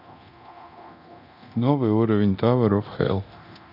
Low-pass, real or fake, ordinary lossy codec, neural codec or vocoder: 5.4 kHz; fake; none; codec, 24 kHz, 0.9 kbps, DualCodec